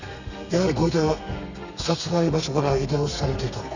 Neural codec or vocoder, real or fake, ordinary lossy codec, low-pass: codec, 32 kHz, 1.9 kbps, SNAC; fake; none; 7.2 kHz